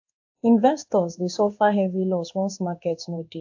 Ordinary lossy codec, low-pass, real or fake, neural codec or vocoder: AAC, 48 kbps; 7.2 kHz; fake; codec, 16 kHz in and 24 kHz out, 1 kbps, XY-Tokenizer